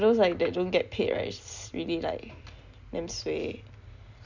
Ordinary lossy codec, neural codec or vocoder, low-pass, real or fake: none; none; 7.2 kHz; real